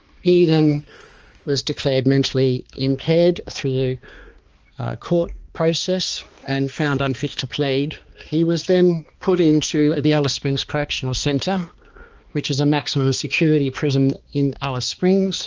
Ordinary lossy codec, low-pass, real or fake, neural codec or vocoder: Opus, 24 kbps; 7.2 kHz; fake; codec, 16 kHz, 2 kbps, X-Codec, HuBERT features, trained on balanced general audio